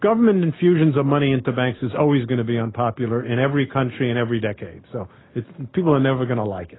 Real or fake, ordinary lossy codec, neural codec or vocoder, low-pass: real; AAC, 16 kbps; none; 7.2 kHz